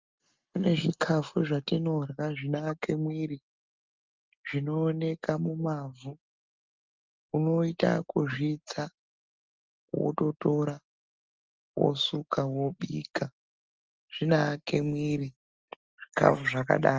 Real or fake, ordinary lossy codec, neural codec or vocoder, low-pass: real; Opus, 24 kbps; none; 7.2 kHz